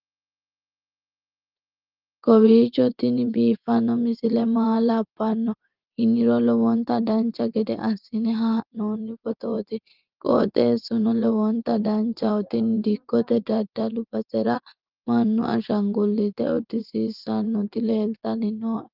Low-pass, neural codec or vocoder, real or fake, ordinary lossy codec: 5.4 kHz; vocoder, 24 kHz, 100 mel bands, Vocos; fake; Opus, 32 kbps